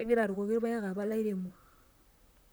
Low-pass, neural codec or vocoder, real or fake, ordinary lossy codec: none; vocoder, 44.1 kHz, 128 mel bands, Pupu-Vocoder; fake; none